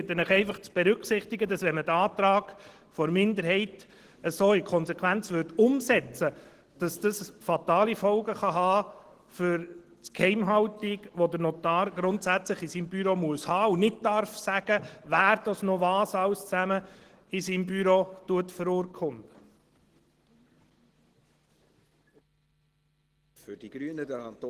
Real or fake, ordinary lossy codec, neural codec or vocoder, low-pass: real; Opus, 16 kbps; none; 14.4 kHz